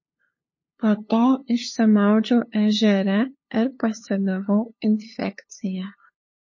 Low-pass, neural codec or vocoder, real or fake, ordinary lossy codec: 7.2 kHz; codec, 16 kHz, 2 kbps, FunCodec, trained on LibriTTS, 25 frames a second; fake; MP3, 32 kbps